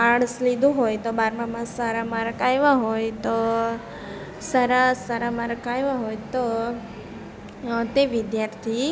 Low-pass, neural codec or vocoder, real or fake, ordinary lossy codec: none; none; real; none